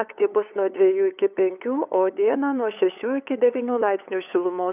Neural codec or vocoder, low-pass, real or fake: codec, 16 kHz, 8 kbps, FunCodec, trained on LibriTTS, 25 frames a second; 3.6 kHz; fake